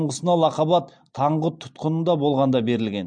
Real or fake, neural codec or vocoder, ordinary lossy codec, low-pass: real; none; MP3, 64 kbps; 9.9 kHz